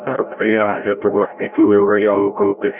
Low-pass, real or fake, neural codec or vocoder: 3.6 kHz; fake; codec, 16 kHz, 0.5 kbps, FreqCodec, larger model